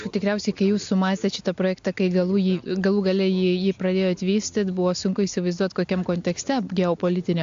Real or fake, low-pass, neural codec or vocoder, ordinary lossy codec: real; 7.2 kHz; none; AAC, 64 kbps